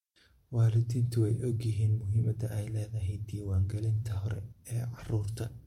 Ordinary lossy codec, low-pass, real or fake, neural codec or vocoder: MP3, 64 kbps; 19.8 kHz; fake; vocoder, 44.1 kHz, 128 mel bands every 512 samples, BigVGAN v2